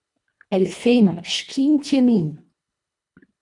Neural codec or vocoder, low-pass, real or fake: codec, 24 kHz, 1.5 kbps, HILCodec; 10.8 kHz; fake